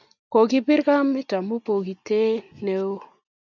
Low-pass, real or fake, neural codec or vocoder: 7.2 kHz; real; none